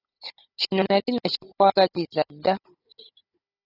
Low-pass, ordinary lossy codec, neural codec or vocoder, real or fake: 5.4 kHz; AAC, 24 kbps; codec, 16 kHz, 16 kbps, FunCodec, trained on Chinese and English, 50 frames a second; fake